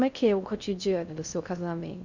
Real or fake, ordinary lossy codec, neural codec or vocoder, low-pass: fake; none; codec, 16 kHz in and 24 kHz out, 0.6 kbps, FocalCodec, streaming, 2048 codes; 7.2 kHz